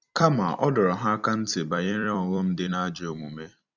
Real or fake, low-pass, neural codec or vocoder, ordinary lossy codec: fake; 7.2 kHz; vocoder, 44.1 kHz, 128 mel bands every 256 samples, BigVGAN v2; none